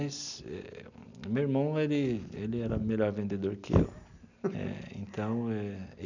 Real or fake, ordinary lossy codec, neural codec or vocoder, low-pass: real; none; none; 7.2 kHz